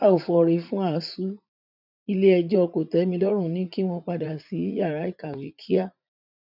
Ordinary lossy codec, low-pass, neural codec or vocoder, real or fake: none; 5.4 kHz; vocoder, 44.1 kHz, 80 mel bands, Vocos; fake